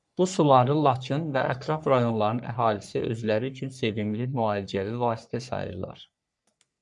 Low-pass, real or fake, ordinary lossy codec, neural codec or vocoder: 10.8 kHz; fake; MP3, 96 kbps; codec, 44.1 kHz, 3.4 kbps, Pupu-Codec